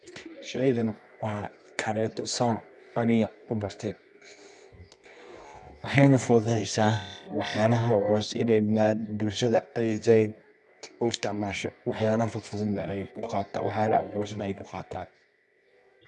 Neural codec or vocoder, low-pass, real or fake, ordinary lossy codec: codec, 24 kHz, 0.9 kbps, WavTokenizer, medium music audio release; none; fake; none